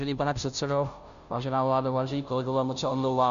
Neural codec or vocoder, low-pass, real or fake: codec, 16 kHz, 0.5 kbps, FunCodec, trained on Chinese and English, 25 frames a second; 7.2 kHz; fake